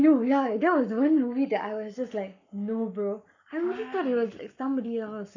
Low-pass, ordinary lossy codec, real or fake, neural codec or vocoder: 7.2 kHz; none; fake; codec, 44.1 kHz, 7.8 kbps, Pupu-Codec